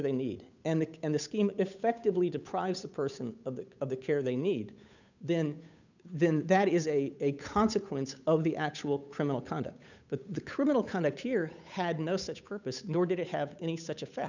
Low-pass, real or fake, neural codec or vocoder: 7.2 kHz; fake; codec, 16 kHz, 8 kbps, FunCodec, trained on Chinese and English, 25 frames a second